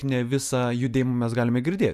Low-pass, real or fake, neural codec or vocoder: 14.4 kHz; real; none